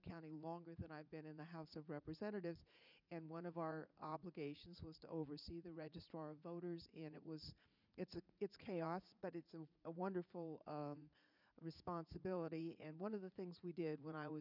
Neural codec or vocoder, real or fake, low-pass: vocoder, 44.1 kHz, 80 mel bands, Vocos; fake; 5.4 kHz